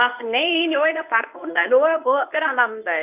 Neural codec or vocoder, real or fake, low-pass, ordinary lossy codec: codec, 24 kHz, 0.9 kbps, WavTokenizer, medium speech release version 1; fake; 3.6 kHz; AAC, 32 kbps